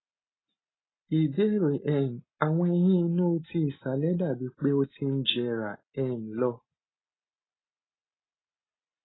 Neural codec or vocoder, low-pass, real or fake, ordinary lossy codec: none; 7.2 kHz; real; AAC, 16 kbps